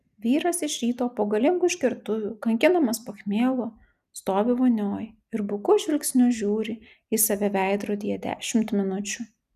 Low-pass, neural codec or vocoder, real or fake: 14.4 kHz; none; real